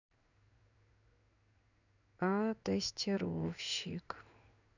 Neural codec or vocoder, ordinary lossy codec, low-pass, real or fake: codec, 16 kHz in and 24 kHz out, 1 kbps, XY-Tokenizer; none; 7.2 kHz; fake